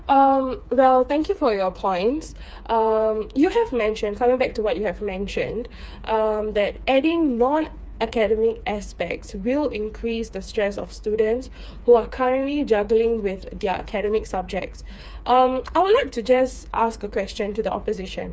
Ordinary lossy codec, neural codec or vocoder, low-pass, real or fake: none; codec, 16 kHz, 4 kbps, FreqCodec, smaller model; none; fake